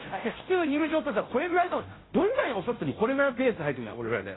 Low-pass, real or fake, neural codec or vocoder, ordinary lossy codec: 7.2 kHz; fake; codec, 16 kHz, 0.5 kbps, FunCodec, trained on Chinese and English, 25 frames a second; AAC, 16 kbps